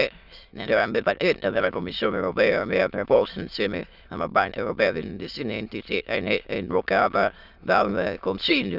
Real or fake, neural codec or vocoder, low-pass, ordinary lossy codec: fake; autoencoder, 22.05 kHz, a latent of 192 numbers a frame, VITS, trained on many speakers; 5.4 kHz; none